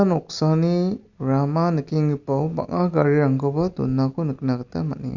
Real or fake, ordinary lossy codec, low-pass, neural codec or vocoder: real; none; 7.2 kHz; none